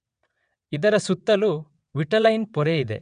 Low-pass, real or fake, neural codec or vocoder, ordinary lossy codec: 9.9 kHz; fake; vocoder, 22.05 kHz, 80 mel bands, WaveNeXt; none